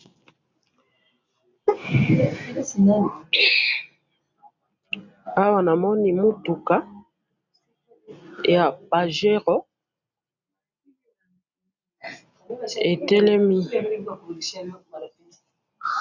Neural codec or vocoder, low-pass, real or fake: none; 7.2 kHz; real